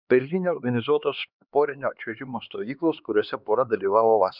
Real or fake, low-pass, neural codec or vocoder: fake; 5.4 kHz; codec, 16 kHz, 4 kbps, X-Codec, HuBERT features, trained on LibriSpeech